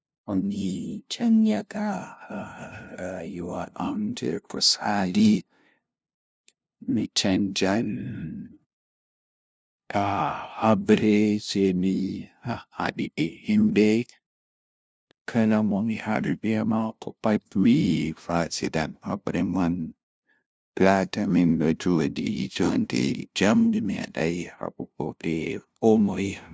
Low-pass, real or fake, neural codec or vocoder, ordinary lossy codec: none; fake; codec, 16 kHz, 0.5 kbps, FunCodec, trained on LibriTTS, 25 frames a second; none